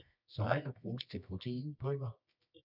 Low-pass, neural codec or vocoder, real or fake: 5.4 kHz; codec, 24 kHz, 0.9 kbps, WavTokenizer, medium music audio release; fake